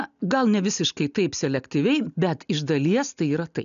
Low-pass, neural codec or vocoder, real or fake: 7.2 kHz; none; real